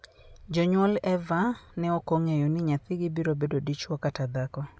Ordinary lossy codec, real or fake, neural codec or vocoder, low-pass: none; real; none; none